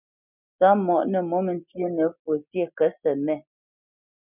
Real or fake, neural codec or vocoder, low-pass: real; none; 3.6 kHz